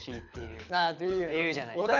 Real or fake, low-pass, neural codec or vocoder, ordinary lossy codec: fake; 7.2 kHz; codec, 24 kHz, 6 kbps, HILCodec; none